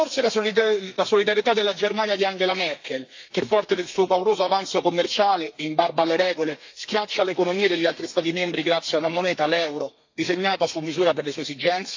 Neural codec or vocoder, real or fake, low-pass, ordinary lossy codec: codec, 32 kHz, 1.9 kbps, SNAC; fake; 7.2 kHz; AAC, 48 kbps